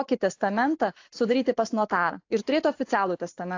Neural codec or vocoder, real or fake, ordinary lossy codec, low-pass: none; real; AAC, 48 kbps; 7.2 kHz